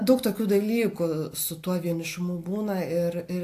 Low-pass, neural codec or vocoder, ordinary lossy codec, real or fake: 14.4 kHz; none; AAC, 64 kbps; real